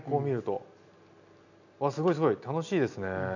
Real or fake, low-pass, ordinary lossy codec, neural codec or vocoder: real; 7.2 kHz; none; none